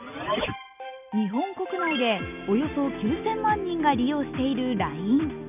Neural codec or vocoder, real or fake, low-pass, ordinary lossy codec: none; real; 3.6 kHz; none